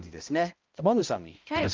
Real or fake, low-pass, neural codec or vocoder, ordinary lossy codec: fake; 7.2 kHz; codec, 16 kHz, 0.5 kbps, X-Codec, HuBERT features, trained on balanced general audio; Opus, 24 kbps